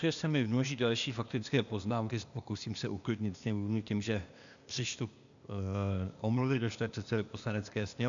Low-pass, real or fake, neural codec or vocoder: 7.2 kHz; fake; codec, 16 kHz, 0.8 kbps, ZipCodec